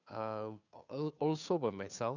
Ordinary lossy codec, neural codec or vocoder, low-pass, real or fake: none; codec, 16 kHz, 2 kbps, FunCodec, trained on Chinese and English, 25 frames a second; 7.2 kHz; fake